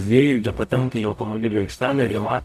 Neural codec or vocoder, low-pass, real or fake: codec, 44.1 kHz, 0.9 kbps, DAC; 14.4 kHz; fake